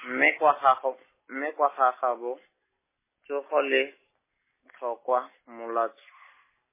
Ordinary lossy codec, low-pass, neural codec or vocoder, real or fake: MP3, 16 kbps; 3.6 kHz; none; real